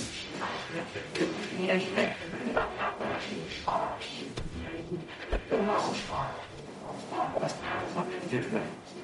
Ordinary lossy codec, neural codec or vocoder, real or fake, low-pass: MP3, 48 kbps; codec, 44.1 kHz, 0.9 kbps, DAC; fake; 19.8 kHz